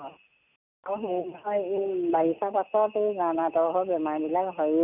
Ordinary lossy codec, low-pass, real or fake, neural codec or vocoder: none; 3.6 kHz; real; none